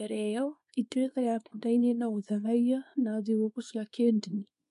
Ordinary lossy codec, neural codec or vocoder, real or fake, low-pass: MP3, 64 kbps; codec, 24 kHz, 0.9 kbps, WavTokenizer, small release; fake; 10.8 kHz